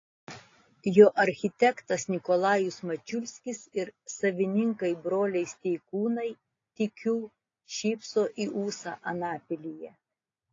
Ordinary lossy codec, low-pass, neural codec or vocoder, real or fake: AAC, 32 kbps; 7.2 kHz; none; real